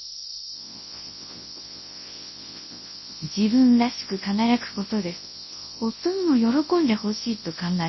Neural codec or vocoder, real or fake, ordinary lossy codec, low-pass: codec, 24 kHz, 0.9 kbps, WavTokenizer, large speech release; fake; MP3, 24 kbps; 7.2 kHz